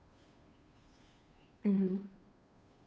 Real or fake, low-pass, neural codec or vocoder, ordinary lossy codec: fake; none; codec, 16 kHz, 2 kbps, FunCodec, trained on Chinese and English, 25 frames a second; none